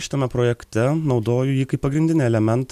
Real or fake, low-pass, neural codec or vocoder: real; 14.4 kHz; none